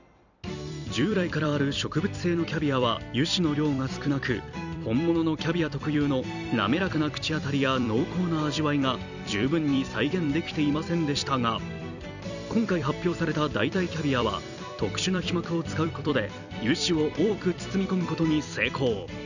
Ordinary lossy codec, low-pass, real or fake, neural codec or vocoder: none; 7.2 kHz; fake; vocoder, 44.1 kHz, 128 mel bands every 256 samples, BigVGAN v2